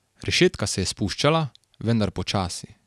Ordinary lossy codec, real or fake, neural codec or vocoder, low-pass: none; real; none; none